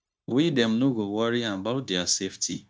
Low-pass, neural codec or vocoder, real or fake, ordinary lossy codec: none; codec, 16 kHz, 0.9 kbps, LongCat-Audio-Codec; fake; none